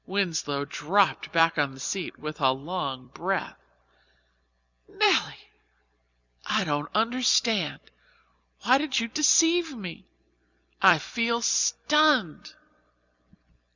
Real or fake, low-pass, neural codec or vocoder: fake; 7.2 kHz; vocoder, 44.1 kHz, 128 mel bands every 256 samples, BigVGAN v2